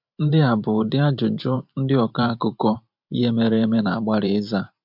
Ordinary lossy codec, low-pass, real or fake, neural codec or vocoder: MP3, 48 kbps; 5.4 kHz; fake; vocoder, 44.1 kHz, 128 mel bands every 512 samples, BigVGAN v2